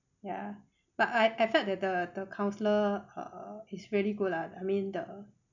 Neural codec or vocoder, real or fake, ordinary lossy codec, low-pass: none; real; none; 7.2 kHz